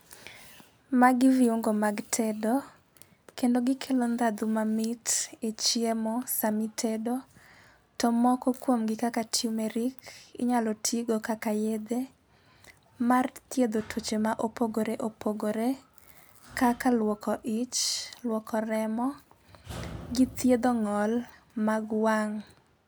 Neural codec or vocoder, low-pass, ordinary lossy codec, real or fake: none; none; none; real